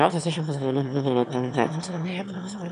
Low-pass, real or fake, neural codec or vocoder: 9.9 kHz; fake; autoencoder, 22.05 kHz, a latent of 192 numbers a frame, VITS, trained on one speaker